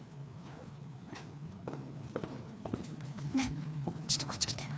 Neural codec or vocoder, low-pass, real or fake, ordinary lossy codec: codec, 16 kHz, 2 kbps, FreqCodec, larger model; none; fake; none